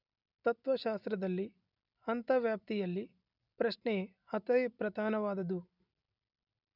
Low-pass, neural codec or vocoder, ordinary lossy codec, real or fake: 5.4 kHz; none; none; real